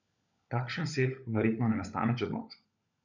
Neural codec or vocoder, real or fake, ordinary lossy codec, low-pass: codec, 16 kHz, 16 kbps, FunCodec, trained on LibriTTS, 50 frames a second; fake; none; 7.2 kHz